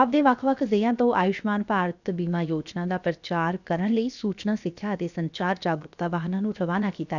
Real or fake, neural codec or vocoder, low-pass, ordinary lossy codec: fake; codec, 16 kHz, 0.7 kbps, FocalCodec; 7.2 kHz; none